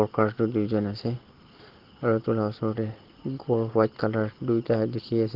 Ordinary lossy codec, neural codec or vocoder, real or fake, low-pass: Opus, 16 kbps; none; real; 5.4 kHz